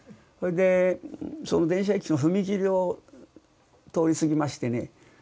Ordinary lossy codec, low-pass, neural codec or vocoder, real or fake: none; none; none; real